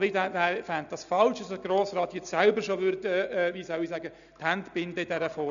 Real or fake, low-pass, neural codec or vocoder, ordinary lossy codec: real; 7.2 kHz; none; none